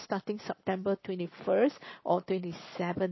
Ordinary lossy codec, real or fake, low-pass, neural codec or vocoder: MP3, 24 kbps; real; 7.2 kHz; none